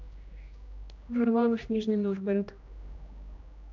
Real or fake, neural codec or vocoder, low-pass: fake; codec, 16 kHz, 1 kbps, X-Codec, HuBERT features, trained on general audio; 7.2 kHz